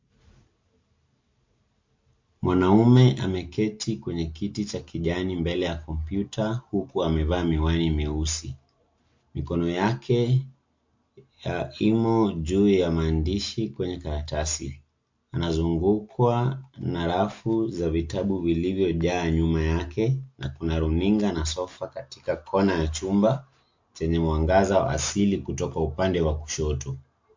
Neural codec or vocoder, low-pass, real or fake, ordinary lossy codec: none; 7.2 kHz; real; MP3, 48 kbps